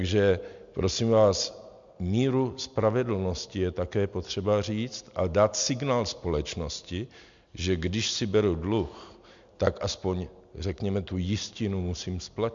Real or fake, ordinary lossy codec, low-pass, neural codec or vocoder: real; MP3, 64 kbps; 7.2 kHz; none